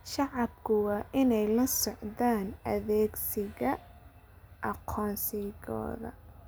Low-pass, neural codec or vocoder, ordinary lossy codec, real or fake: none; none; none; real